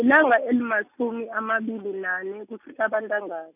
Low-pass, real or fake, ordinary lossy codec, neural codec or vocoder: 3.6 kHz; real; none; none